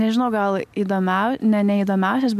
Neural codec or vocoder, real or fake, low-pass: none; real; 14.4 kHz